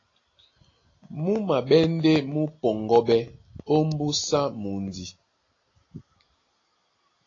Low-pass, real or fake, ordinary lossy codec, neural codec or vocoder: 7.2 kHz; real; AAC, 32 kbps; none